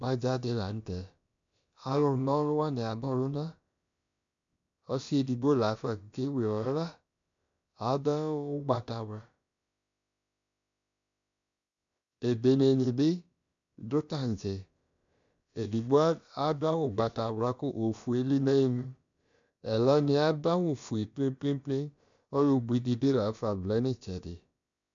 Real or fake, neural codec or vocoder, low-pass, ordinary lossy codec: fake; codec, 16 kHz, about 1 kbps, DyCAST, with the encoder's durations; 7.2 kHz; MP3, 64 kbps